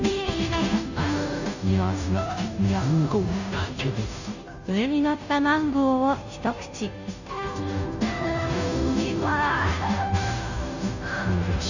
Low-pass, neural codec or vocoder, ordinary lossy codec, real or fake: 7.2 kHz; codec, 16 kHz, 0.5 kbps, FunCodec, trained on Chinese and English, 25 frames a second; none; fake